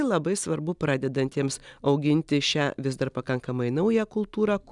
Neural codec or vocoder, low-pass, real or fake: none; 10.8 kHz; real